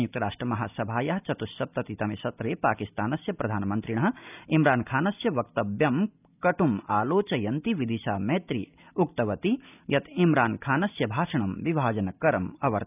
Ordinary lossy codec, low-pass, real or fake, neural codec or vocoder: none; 3.6 kHz; real; none